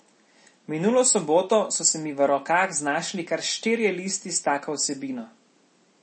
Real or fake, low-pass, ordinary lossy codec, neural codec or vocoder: real; 10.8 kHz; MP3, 32 kbps; none